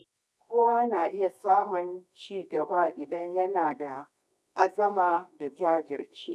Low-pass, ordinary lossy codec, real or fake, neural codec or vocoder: none; none; fake; codec, 24 kHz, 0.9 kbps, WavTokenizer, medium music audio release